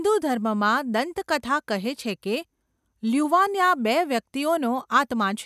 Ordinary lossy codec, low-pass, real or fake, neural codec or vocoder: none; 14.4 kHz; real; none